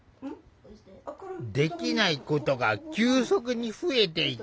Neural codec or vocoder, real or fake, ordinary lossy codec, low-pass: none; real; none; none